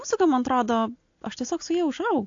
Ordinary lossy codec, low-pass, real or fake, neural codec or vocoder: AAC, 48 kbps; 7.2 kHz; real; none